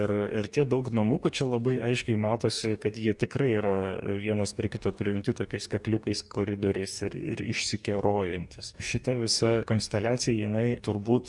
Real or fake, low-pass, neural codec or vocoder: fake; 10.8 kHz; codec, 44.1 kHz, 2.6 kbps, DAC